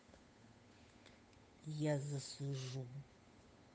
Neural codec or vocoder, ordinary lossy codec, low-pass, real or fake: codec, 16 kHz, 8 kbps, FunCodec, trained on Chinese and English, 25 frames a second; none; none; fake